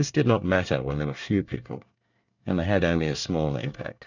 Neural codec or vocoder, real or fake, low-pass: codec, 24 kHz, 1 kbps, SNAC; fake; 7.2 kHz